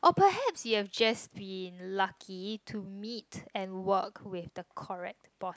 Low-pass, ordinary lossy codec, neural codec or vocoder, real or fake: none; none; none; real